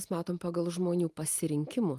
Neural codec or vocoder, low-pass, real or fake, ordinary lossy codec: none; 14.4 kHz; real; Opus, 32 kbps